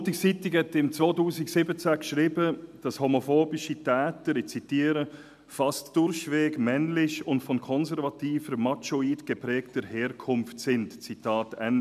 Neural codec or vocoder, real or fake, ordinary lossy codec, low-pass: none; real; none; 14.4 kHz